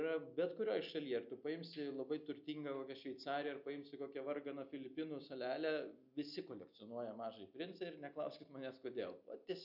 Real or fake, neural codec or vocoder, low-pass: real; none; 5.4 kHz